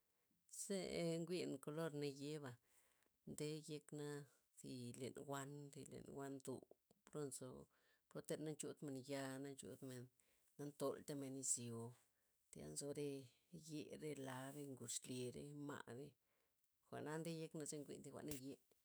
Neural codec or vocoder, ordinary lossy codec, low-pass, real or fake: autoencoder, 48 kHz, 128 numbers a frame, DAC-VAE, trained on Japanese speech; none; none; fake